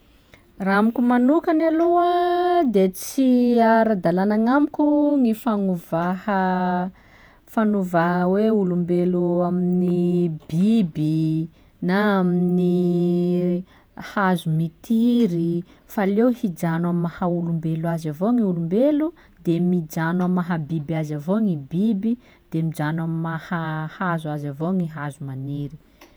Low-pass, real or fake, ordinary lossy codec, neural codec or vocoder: none; fake; none; vocoder, 48 kHz, 128 mel bands, Vocos